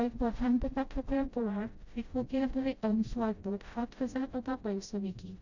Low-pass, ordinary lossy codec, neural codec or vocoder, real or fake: 7.2 kHz; none; codec, 16 kHz, 0.5 kbps, FreqCodec, smaller model; fake